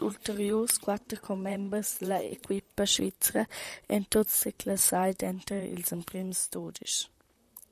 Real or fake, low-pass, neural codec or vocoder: fake; 14.4 kHz; vocoder, 44.1 kHz, 128 mel bands, Pupu-Vocoder